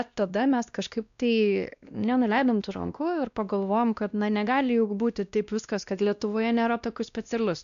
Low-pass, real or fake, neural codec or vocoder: 7.2 kHz; fake; codec, 16 kHz, 1 kbps, X-Codec, WavLM features, trained on Multilingual LibriSpeech